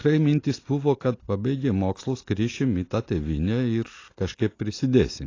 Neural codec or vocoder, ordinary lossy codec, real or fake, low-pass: none; AAC, 32 kbps; real; 7.2 kHz